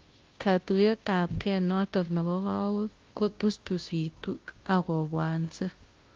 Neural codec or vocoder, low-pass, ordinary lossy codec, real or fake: codec, 16 kHz, 0.5 kbps, FunCodec, trained on Chinese and English, 25 frames a second; 7.2 kHz; Opus, 32 kbps; fake